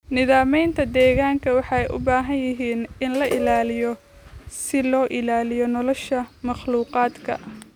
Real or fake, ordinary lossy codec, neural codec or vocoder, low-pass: real; none; none; 19.8 kHz